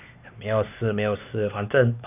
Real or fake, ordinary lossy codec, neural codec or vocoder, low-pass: fake; none; codec, 16 kHz, 4 kbps, X-Codec, HuBERT features, trained on LibriSpeech; 3.6 kHz